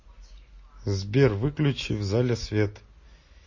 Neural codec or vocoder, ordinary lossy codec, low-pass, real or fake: none; MP3, 32 kbps; 7.2 kHz; real